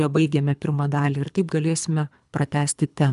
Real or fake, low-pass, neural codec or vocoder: fake; 10.8 kHz; codec, 24 kHz, 3 kbps, HILCodec